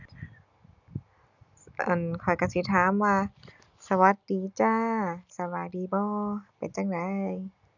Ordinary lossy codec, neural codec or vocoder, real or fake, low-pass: none; none; real; 7.2 kHz